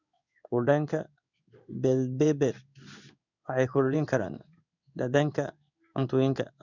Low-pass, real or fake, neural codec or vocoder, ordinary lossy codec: 7.2 kHz; fake; codec, 16 kHz in and 24 kHz out, 1 kbps, XY-Tokenizer; none